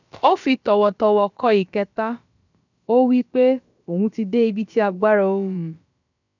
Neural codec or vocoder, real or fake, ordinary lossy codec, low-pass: codec, 16 kHz, about 1 kbps, DyCAST, with the encoder's durations; fake; none; 7.2 kHz